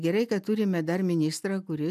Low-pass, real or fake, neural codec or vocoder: 14.4 kHz; real; none